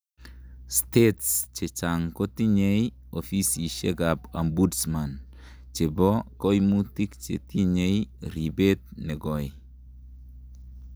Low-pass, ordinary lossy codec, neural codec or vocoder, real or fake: none; none; none; real